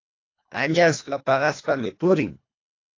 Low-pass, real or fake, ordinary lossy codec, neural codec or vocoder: 7.2 kHz; fake; AAC, 32 kbps; codec, 24 kHz, 1.5 kbps, HILCodec